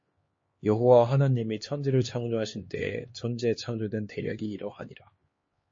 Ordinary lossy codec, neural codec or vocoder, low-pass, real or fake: MP3, 32 kbps; codec, 16 kHz, 2 kbps, X-Codec, HuBERT features, trained on LibriSpeech; 7.2 kHz; fake